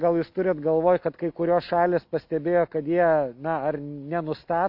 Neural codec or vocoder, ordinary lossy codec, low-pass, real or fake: none; AAC, 48 kbps; 5.4 kHz; real